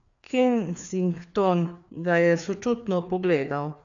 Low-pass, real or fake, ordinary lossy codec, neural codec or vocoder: 7.2 kHz; fake; none; codec, 16 kHz, 2 kbps, FreqCodec, larger model